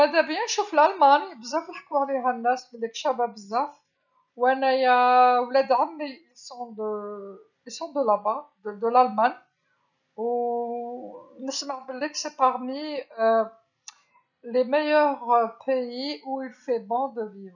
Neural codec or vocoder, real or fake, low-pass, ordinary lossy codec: none; real; 7.2 kHz; none